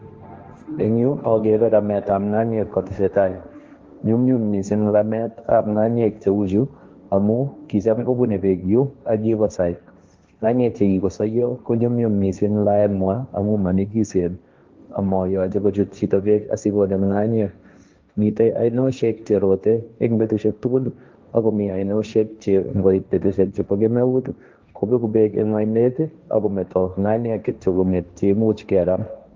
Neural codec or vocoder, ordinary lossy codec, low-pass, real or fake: codec, 16 kHz, 1.1 kbps, Voila-Tokenizer; Opus, 24 kbps; 7.2 kHz; fake